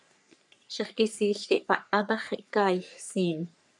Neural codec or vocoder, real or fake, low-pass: codec, 44.1 kHz, 3.4 kbps, Pupu-Codec; fake; 10.8 kHz